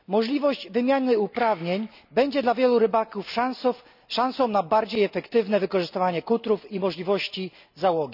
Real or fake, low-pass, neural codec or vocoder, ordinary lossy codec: real; 5.4 kHz; none; none